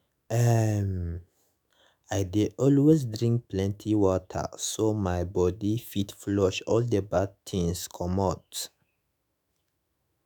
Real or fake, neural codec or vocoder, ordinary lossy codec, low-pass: fake; autoencoder, 48 kHz, 128 numbers a frame, DAC-VAE, trained on Japanese speech; none; none